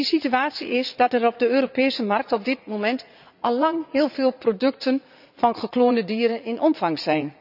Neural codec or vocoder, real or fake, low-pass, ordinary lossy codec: vocoder, 44.1 kHz, 80 mel bands, Vocos; fake; 5.4 kHz; none